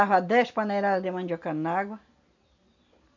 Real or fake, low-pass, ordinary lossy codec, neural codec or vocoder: real; 7.2 kHz; none; none